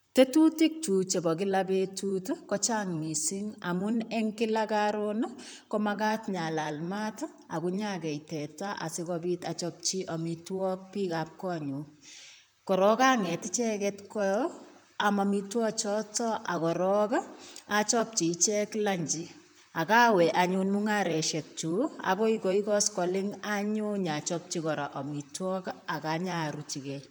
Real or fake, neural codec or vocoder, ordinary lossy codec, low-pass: fake; vocoder, 44.1 kHz, 128 mel bands, Pupu-Vocoder; none; none